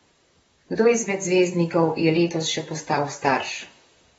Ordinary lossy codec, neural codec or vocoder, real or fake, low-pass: AAC, 24 kbps; vocoder, 44.1 kHz, 128 mel bands, Pupu-Vocoder; fake; 19.8 kHz